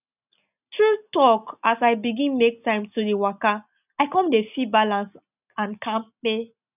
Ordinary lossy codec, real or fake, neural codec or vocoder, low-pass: none; real; none; 3.6 kHz